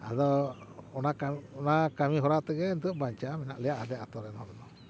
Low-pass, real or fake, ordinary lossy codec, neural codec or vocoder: none; real; none; none